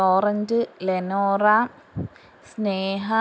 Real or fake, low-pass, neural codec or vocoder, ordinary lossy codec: real; none; none; none